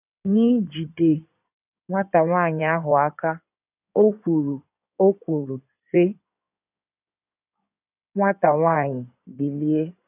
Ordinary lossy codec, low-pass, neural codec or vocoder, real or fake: none; 3.6 kHz; codec, 16 kHz in and 24 kHz out, 2.2 kbps, FireRedTTS-2 codec; fake